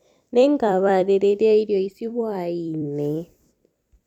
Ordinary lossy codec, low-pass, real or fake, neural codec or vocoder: none; 19.8 kHz; fake; vocoder, 44.1 kHz, 128 mel bands every 256 samples, BigVGAN v2